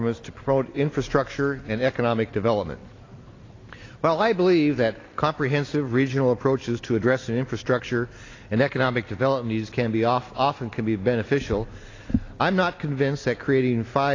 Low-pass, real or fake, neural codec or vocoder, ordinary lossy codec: 7.2 kHz; real; none; AAC, 32 kbps